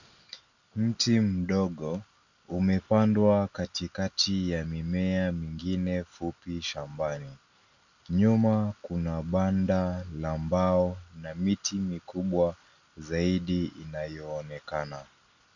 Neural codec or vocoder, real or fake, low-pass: none; real; 7.2 kHz